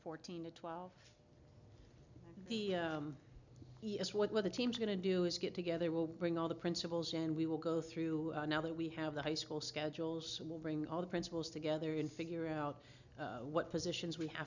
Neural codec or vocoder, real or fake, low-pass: none; real; 7.2 kHz